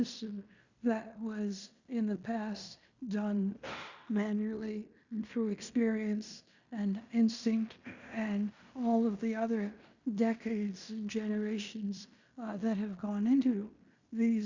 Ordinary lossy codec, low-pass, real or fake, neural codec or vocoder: Opus, 64 kbps; 7.2 kHz; fake; codec, 16 kHz in and 24 kHz out, 0.9 kbps, LongCat-Audio-Codec, fine tuned four codebook decoder